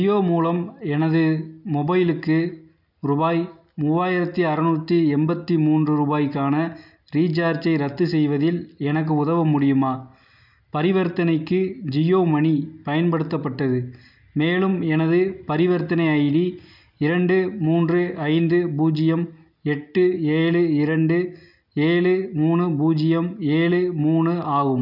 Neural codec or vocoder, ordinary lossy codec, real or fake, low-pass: none; none; real; 5.4 kHz